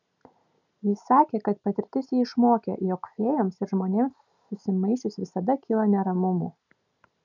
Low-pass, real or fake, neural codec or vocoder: 7.2 kHz; real; none